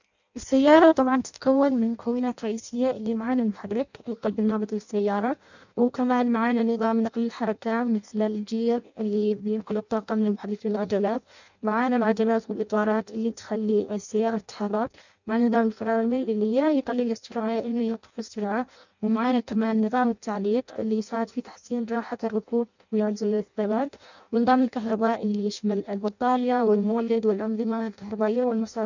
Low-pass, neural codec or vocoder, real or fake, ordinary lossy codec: 7.2 kHz; codec, 16 kHz in and 24 kHz out, 0.6 kbps, FireRedTTS-2 codec; fake; none